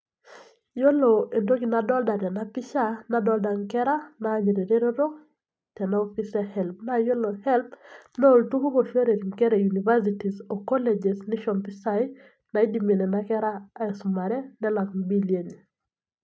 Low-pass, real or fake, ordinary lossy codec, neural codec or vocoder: none; real; none; none